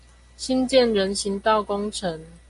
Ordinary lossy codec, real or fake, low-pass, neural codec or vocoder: AAC, 48 kbps; real; 10.8 kHz; none